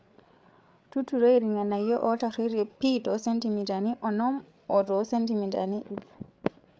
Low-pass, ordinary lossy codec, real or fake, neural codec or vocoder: none; none; fake; codec, 16 kHz, 8 kbps, FreqCodec, larger model